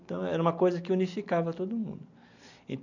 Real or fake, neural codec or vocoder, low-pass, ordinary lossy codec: real; none; 7.2 kHz; none